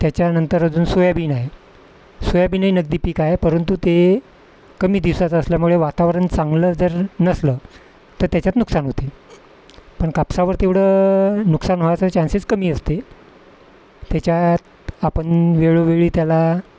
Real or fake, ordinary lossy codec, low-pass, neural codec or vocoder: real; none; none; none